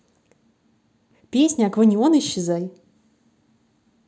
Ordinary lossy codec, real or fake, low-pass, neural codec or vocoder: none; real; none; none